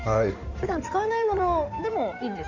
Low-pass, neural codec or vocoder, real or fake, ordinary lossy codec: 7.2 kHz; codec, 16 kHz in and 24 kHz out, 2.2 kbps, FireRedTTS-2 codec; fake; none